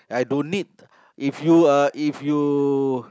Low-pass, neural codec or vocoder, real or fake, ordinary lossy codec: none; none; real; none